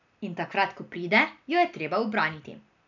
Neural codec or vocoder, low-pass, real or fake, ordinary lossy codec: none; 7.2 kHz; real; none